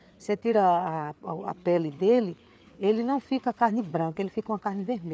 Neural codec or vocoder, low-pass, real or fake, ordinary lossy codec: codec, 16 kHz, 4 kbps, FreqCodec, larger model; none; fake; none